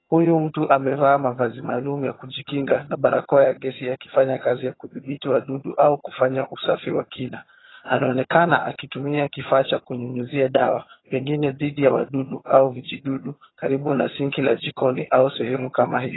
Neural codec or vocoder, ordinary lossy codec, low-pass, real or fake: vocoder, 22.05 kHz, 80 mel bands, HiFi-GAN; AAC, 16 kbps; 7.2 kHz; fake